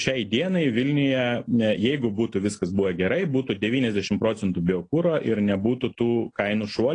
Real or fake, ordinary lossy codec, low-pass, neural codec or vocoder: real; AAC, 32 kbps; 9.9 kHz; none